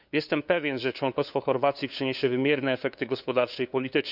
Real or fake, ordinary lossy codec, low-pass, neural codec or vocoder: fake; none; 5.4 kHz; codec, 16 kHz, 2 kbps, FunCodec, trained on LibriTTS, 25 frames a second